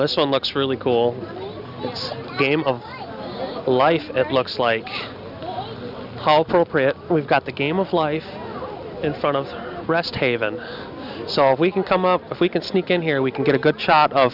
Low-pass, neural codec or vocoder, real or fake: 5.4 kHz; none; real